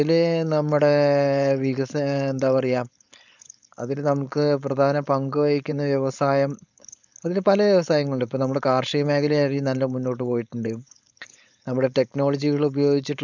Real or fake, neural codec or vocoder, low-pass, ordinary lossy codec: fake; codec, 16 kHz, 4.8 kbps, FACodec; 7.2 kHz; none